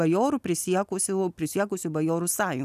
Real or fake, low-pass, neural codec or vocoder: real; 14.4 kHz; none